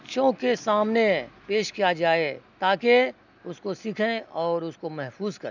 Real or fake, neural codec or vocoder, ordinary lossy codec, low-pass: real; none; none; 7.2 kHz